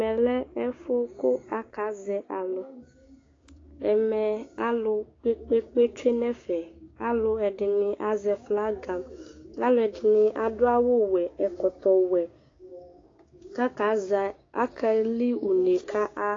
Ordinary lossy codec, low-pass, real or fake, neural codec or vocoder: AAC, 32 kbps; 7.2 kHz; fake; codec, 16 kHz, 6 kbps, DAC